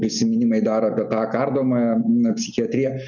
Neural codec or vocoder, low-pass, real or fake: none; 7.2 kHz; real